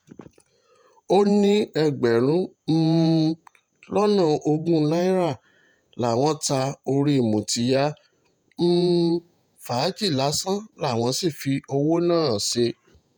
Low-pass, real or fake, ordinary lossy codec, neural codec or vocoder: none; fake; none; vocoder, 48 kHz, 128 mel bands, Vocos